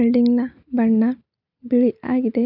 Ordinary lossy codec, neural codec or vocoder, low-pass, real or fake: none; none; 5.4 kHz; real